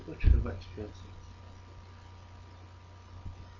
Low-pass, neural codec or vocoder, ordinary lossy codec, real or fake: 7.2 kHz; none; none; real